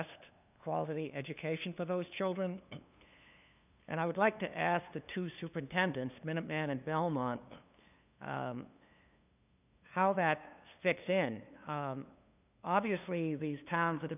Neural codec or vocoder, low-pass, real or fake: codec, 16 kHz, 2 kbps, FunCodec, trained on LibriTTS, 25 frames a second; 3.6 kHz; fake